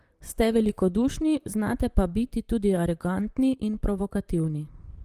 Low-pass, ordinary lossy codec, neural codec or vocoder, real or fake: 14.4 kHz; Opus, 24 kbps; vocoder, 44.1 kHz, 128 mel bands, Pupu-Vocoder; fake